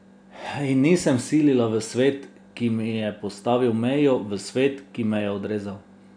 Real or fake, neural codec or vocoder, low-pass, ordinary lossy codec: real; none; 9.9 kHz; none